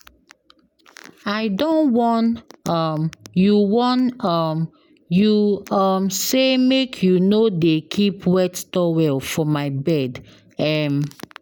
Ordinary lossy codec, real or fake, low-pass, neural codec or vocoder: none; real; 19.8 kHz; none